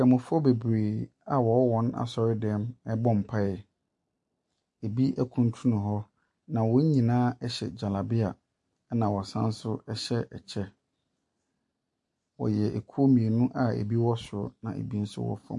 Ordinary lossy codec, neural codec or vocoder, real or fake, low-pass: MP3, 48 kbps; none; real; 10.8 kHz